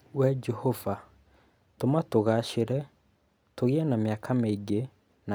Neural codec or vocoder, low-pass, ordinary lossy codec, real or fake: none; none; none; real